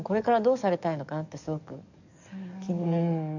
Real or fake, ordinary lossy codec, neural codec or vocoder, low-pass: fake; none; codec, 44.1 kHz, 7.8 kbps, DAC; 7.2 kHz